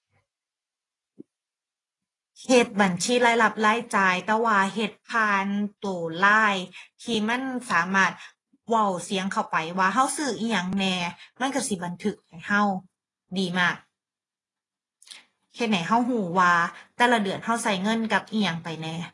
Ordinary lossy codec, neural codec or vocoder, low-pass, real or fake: AAC, 32 kbps; none; 10.8 kHz; real